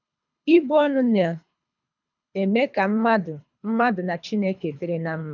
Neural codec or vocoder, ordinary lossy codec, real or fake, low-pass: codec, 24 kHz, 3 kbps, HILCodec; none; fake; 7.2 kHz